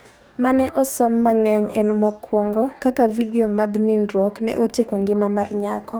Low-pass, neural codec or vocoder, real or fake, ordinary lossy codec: none; codec, 44.1 kHz, 2.6 kbps, DAC; fake; none